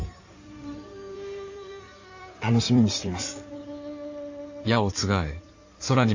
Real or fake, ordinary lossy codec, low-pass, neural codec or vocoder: fake; AAC, 48 kbps; 7.2 kHz; codec, 16 kHz in and 24 kHz out, 2.2 kbps, FireRedTTS-2 codec